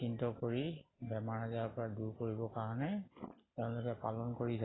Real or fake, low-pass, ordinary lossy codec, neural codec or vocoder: real; 7.2 kHz; AAC, 16 kbps; none